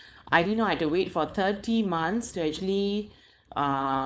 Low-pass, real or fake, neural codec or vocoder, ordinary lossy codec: none; fake; codec, 16 kHz, 4.8 kbps, FACodec; none